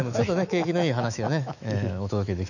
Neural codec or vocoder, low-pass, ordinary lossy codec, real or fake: autoencoder, 48 kHz, 128 numbers a frame, DAC-VAE, trained on Japanese speech; 7.2 kHz; none; fake